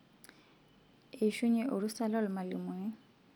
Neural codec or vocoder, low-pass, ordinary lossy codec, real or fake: none; 19.8 kHz; none; real